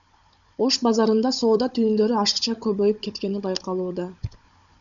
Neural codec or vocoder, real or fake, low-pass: codec, 16 kHz, 16 kbps, FunCodec, trained on Chinese and English, 50 frames a second; fake; 7.2 kHz